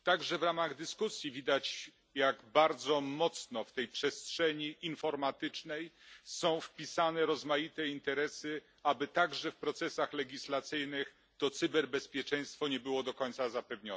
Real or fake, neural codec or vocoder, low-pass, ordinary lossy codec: real; none; none; none